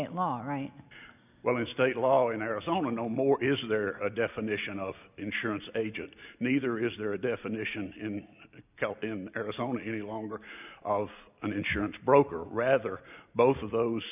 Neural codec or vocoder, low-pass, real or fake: none; 3.6 kHz; real